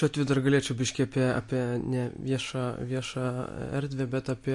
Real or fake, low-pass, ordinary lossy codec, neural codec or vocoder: real; 10.8 kHz; MP3, 48 kbps; none